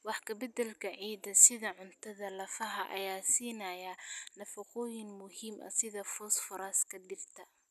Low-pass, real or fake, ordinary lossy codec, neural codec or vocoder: 14.4 kHz; real; none; none